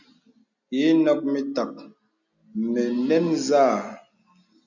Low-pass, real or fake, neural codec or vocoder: 7.2 kHz; real; none